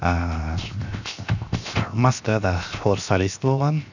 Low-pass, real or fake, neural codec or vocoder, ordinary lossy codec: 7.2 kHz; fake; codec, 16 kHz, 0.7 kbps, FocalCodec; none